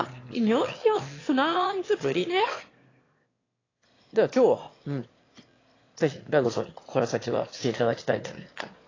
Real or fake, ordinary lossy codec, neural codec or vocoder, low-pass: fake; AAC, 32 kbps; autoencoder, 22.05 kHz, a latent of 192 numbers a frame, VITS, trained on one speaker; 7.2 kHz